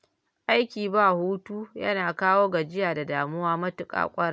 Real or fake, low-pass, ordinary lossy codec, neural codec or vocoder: real; none; none; none